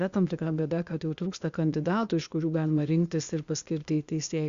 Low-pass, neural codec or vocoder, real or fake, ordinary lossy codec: 7.2 kHz; codec, 16 kHz, 0.8 kbps, ZipCodec; fake; Opus, 64 kbps